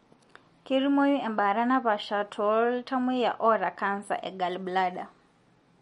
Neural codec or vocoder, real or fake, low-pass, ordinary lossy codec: none; real; 19.8 kHz; MP3, 48 kbps